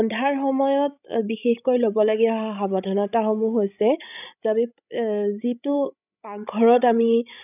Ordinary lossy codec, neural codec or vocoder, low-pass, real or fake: none; codec, 16 kHz, 16 kbps, FreqCodec, larger model; 3.6 kHz; fake